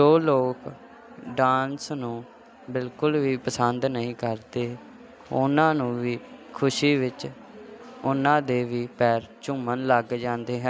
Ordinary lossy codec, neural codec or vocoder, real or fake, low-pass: none; none; real; none